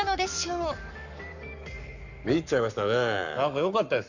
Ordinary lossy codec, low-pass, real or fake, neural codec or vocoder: none; 7.2 kHz; fake; codec, 44.1 kHz, 7.8 kbps, Pupu-Codec